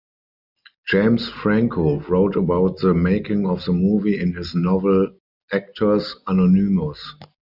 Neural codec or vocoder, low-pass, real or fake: none; 5.4 kHz; real